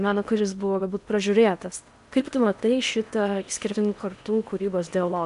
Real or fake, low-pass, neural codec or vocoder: fake; 10.8 kHz; codec, 16 kHz in and 24 kHz out, 0.8 kbps, FocalCodec, streaming, 65536 codes